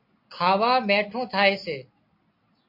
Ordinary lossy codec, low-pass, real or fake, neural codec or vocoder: MP3, 32 kbps; 5.4 kHz; real; none